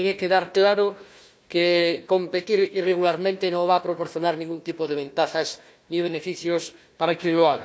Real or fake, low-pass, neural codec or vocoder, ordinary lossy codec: fake; none; codec, 16 kHz, 1 kbps, FunCodec, trained on Chinese and English, 50 frames a second; none